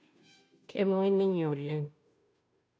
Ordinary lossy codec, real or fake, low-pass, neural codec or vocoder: none; fake; none; codec, 16 kHz, 0.5 kbps, FunCodec, trained on Chinese and English, 25 frames a second